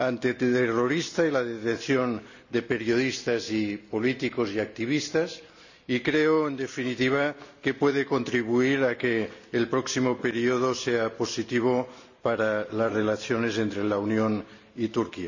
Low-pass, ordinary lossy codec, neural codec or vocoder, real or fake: 7.2 kHz; none; none; real